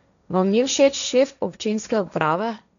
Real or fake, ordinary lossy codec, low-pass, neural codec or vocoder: fake; none; 7.2 kHz; codec, 16 kHz, 1.1 kbps, Voila-Tokenizer